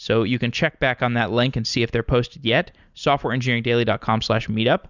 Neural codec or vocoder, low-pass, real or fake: none; 7.2 kHz; real